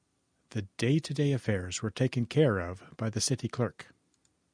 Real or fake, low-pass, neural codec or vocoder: real; 9.9 kHz; none